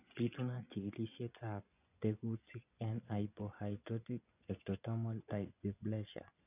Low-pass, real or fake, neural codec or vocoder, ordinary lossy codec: 3.6 kHz; fake; vocoder, 24 kHz, 100 mel bands, Vocos; none